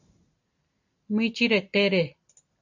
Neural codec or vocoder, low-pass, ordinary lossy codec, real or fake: none; 7.2 kHz; AAC, 48 kbps; real